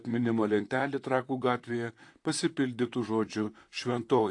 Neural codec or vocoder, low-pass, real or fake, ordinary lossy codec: vocoder, 44.1 kHz, 128 mel bands, Pupu-Vocoder; 10.8 kHz; fake; AAC, 48 kbps